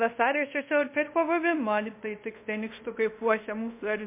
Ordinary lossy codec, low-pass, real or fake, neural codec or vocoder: MP3, 24 kbps; 3.6 kHz; fake; codec, 24 kHz, 0.5 kbps, DualCodec